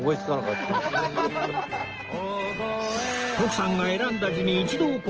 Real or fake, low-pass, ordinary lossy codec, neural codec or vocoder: real; 7.2 kHz; Opus, 16 kbps; none